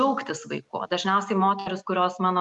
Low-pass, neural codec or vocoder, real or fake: 10.8 kHz; none; real